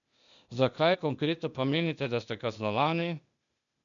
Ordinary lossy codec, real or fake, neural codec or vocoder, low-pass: MP3, 96 kbps; fake; codec, 16 kHz, 0.8 kbps, ZipCodec; 7.2 kHz